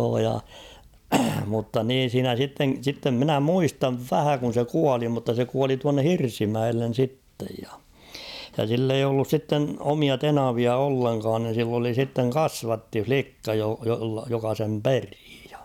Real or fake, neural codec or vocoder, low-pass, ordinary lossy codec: real; none; 19.8 kHz; none